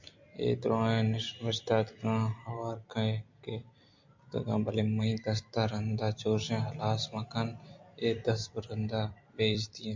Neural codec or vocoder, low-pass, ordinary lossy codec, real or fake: vocoder, 44.1 kHz, 128 mel bands every 256 samples, BigVGAN v2; 7.2 kHz; MP3, 64 kbps; fake